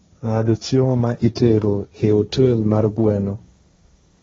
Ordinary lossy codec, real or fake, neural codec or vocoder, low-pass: AAC, 24 kbps; fake; codec, 16 kHz, 1.1 kbps, Voila-Tokenizer; 7.2 kHz